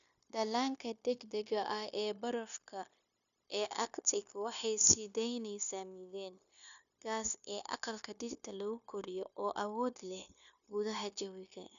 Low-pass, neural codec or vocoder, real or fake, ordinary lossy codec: 7.2 kHz; codec, 16 kHz, 0.9 kbps, LongCat-Audio-Codec; fake; none